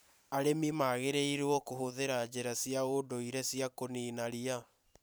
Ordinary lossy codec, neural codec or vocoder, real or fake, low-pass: none; vocoder, 44.1 kHz, 128 mel bands every 512 samples, BigVGAN v2; fake; none